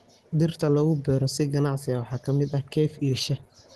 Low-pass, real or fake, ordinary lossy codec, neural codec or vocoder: 10.8 kHz; real; Opus, 16 kbps; none